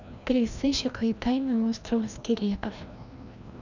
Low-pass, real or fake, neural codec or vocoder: 7.2 kHz; fake; codec, 16 kHz, 1 kbps, FreqCodec, larger model